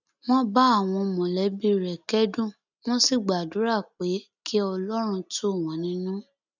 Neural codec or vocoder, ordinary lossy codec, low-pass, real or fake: none; none; 7.2 kHz; real